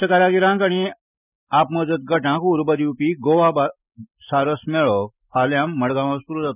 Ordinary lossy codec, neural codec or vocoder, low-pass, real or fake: none; none; 3.6 kHz; real